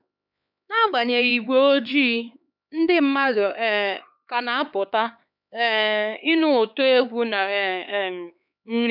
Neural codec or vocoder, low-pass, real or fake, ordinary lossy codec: codec, 16 kHz, 4 kbps, X-Codec, HuBERT features, trained on LibriSpeech; 5.4 kHz; fake; none